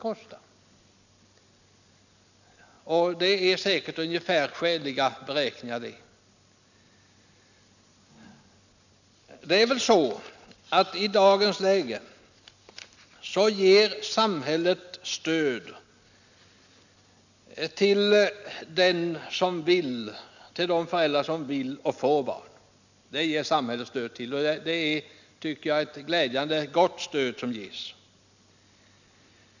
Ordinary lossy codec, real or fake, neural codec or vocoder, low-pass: none; real; none; 7.2 kHz